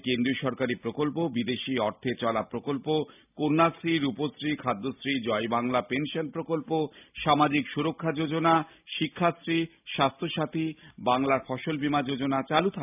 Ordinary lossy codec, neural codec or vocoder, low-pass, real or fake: none; none; 3.6 kHz; real